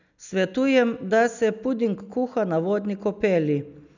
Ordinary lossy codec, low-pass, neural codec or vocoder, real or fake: none; 7.2 kHz; none; real